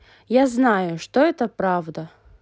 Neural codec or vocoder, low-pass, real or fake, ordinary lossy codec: none; none; real; none